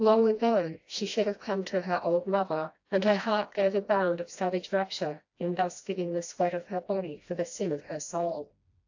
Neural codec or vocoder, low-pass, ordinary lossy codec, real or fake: codec, 16 kHz, 1 kbps, FreqCodec, smaller model; 7.2 kHz; AAC, 48 kbps; fake